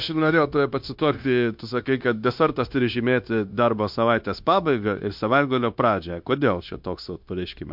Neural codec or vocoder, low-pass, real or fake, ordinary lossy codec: codec, 16 kHz, 0.9 kbps, LongCat-Audio-Codec; 5.4 kHz; fake; MP3, 48 kbps